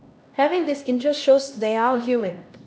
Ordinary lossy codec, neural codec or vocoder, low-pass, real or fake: none; codec, 16 kHz, 1 kbps, X-Codec, HuBERT features, trained on LibriSpeech; none; fake